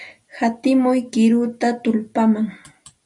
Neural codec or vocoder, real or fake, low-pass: none; real; 10.8 kHz